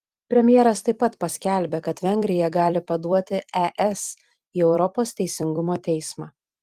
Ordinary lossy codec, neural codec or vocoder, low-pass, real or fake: Opus, 24 kbps; vocoder, 44.1 kHz, 128 mel bands every 256 samples, BigVGAN v2; 14.4 kHz; fake